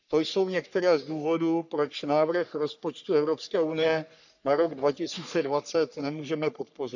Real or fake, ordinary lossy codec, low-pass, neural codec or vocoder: fake; none; 7.2 kHz; codec, 44.1 kHz, 3.4 kbps, Pupu-Codec